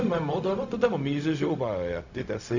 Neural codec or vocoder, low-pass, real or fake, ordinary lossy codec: codec, 16 kHz, 0.4 kbps, LongCat-Audio-Codec; 7.2 kHz; fake; none